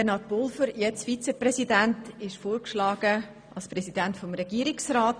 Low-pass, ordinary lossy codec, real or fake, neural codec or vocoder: 9.9 kHz; none; real; none